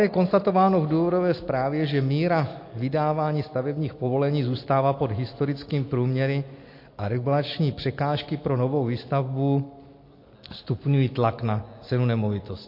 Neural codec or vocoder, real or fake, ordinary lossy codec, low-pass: none; real; MP3, 32 kbps; 5.4 kHz